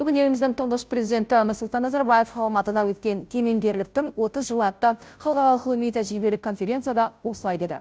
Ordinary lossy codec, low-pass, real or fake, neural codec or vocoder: none; none; fake; codec, 16 kHz, 0.5 kbps, FunCodec, trained on Chinese and English, 25 frames a second